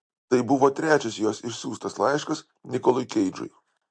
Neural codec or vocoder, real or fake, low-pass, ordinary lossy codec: none; real; 9.9 kHz; MP3, 48 kbps